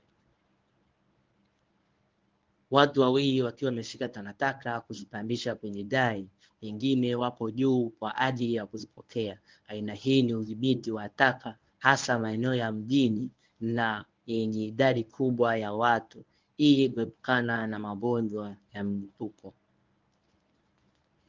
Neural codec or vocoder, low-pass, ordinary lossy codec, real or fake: codec, 24 kHz, 0.9 kbps, WavTokenizer, medium speech release version 1; 7.2 kHz; Opus, 32 kbps; fake